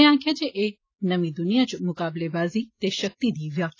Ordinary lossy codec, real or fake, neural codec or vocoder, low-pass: AAC, 32 kbps; real; none; 7.2 kHz